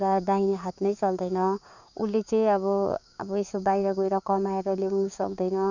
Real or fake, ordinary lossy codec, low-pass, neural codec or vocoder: fake; none; 7.2 kHz; codec, 16 kHz, 6 kbps, DAC